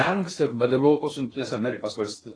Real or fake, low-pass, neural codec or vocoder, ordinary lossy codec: fake; 9.9 kHz; codec, 16 kHz in and 24 kHz out, 0.6 kbps, FocalCodec, streaming, 2048 codes; AAC, 32 kbps